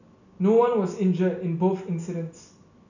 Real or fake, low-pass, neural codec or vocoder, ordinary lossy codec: real; 7.2 kHz; none; none